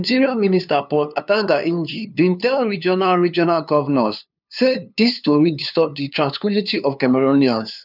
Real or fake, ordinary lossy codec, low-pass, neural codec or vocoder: fake; none; 5.4 kHz; codec, 16 kHz, 2 kbps, FunCodec, trained on LibriTTS, 25 frames a second